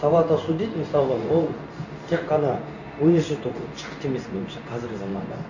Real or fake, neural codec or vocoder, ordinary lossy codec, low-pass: fake; codec, 16 kHz in and 24 kHz out, 1 kbps, XY-Tokenizer; none; 7.2 kHz